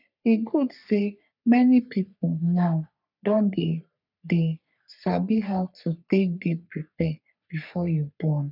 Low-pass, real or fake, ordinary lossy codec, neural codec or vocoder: 5.4 kHz; fake; none; codec, 44.1 kHz, 3.4 kbps, Pupu-Codec